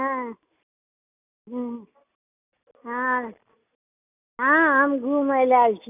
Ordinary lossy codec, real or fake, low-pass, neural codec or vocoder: none; real; 3.6 kHz; none